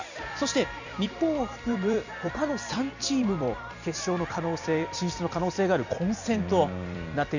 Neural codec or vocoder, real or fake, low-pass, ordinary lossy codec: vocoder, 44.1 kHz, 128 mel bands every 256 samples, BigVGAN v2; fake; 7.2 kHz; none